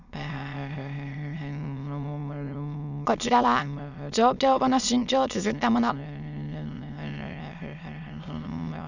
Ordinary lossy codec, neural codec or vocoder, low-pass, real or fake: none; autoencoder, 22.05 kHz, a latent of 192 numbers a frame, VITS, trained on many speakers; 7.2 kHz; fake